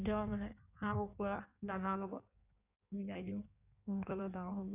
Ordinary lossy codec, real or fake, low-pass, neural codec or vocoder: none; fake; 3.6 kHz; codec, 16 kHz in and 24 kHz out, 1.1 kbps, FireRedTTS-2 codec